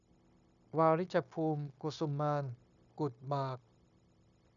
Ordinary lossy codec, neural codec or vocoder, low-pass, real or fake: MP3, 96 kbps; codec, 16 kHz, 0.9 kbps, LongCat-Audio-Codec; 7.2 kHz; fake